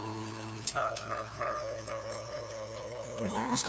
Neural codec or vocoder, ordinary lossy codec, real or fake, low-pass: codec, 16 kHz, 2 kbps, FunCodec, trained on LibriTTS, 25 frames a second; none; fake; none